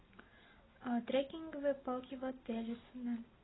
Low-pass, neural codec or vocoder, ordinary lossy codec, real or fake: 7.2 kHz; none; AAC, 16 kbps; real